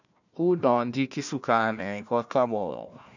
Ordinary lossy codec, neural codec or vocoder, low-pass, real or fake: none; codec, 16 kHz, 1 kbps, FunCodec, trained on Chinese and English, 50 frames a second; 7.2 kHz; fake